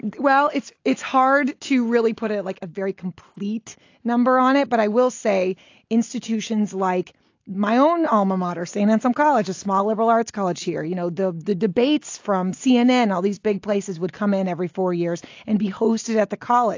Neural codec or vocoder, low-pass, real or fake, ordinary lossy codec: none; 7.2 kHz; real; AAC, 48 kbps